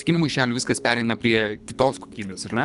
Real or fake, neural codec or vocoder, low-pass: fake; codec, 24 kHz, 3 kbps, HILCodec; 10.8 kHz